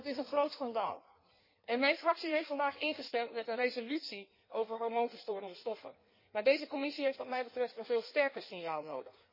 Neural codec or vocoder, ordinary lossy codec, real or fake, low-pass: codec, 16 kHz in and 24 kHz out, 1.1 kbps, FireRedTTS-2 codec; MP3, 24 kbps; fake; 5.4 kHz